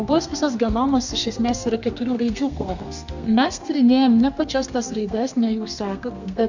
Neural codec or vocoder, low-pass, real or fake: codec, 32 kHz, 1.9 kbps, SNAC; 7.2 kHz; fake